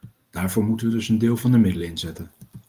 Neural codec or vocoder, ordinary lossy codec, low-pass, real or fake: none; Opus, 24 kbps; 14.4 kHz; real